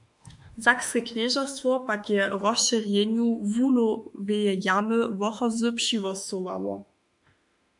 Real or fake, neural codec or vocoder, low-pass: fake; autoencoder, 48 kHz, 32 numbers a frame, DAC-VAE, trained on Japanese speech; 10.8 kHz